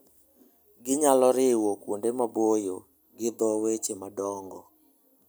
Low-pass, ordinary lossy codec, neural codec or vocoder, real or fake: none; none; none; real